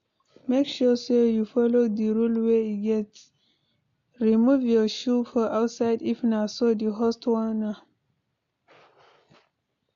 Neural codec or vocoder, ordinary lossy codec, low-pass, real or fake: none; AAC, 64 kbps; 7.2 kHz; real